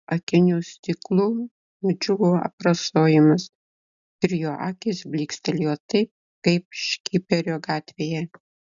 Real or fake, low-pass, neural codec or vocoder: real; 7.2 kHz; none